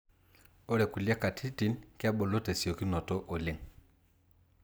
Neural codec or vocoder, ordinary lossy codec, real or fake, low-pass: none; none; real; none